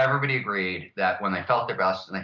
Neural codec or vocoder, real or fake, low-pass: none; real; 7.2 kHz